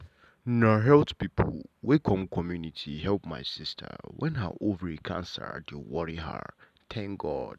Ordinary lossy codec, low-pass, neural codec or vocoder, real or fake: none; 14.4 kHz; none; real